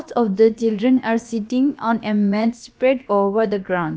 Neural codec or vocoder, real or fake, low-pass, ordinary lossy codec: codec, 16 kHz, about 1 kbps, DyCAST, with the encoder's durations; fake; none; none